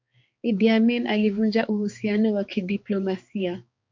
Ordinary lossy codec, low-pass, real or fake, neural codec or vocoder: MP3, 48 kbps; 7.2 kHz; fake; codec, 16 kHz, 4 kbps, X-Codec, HuBERT features, trained on balanced general audio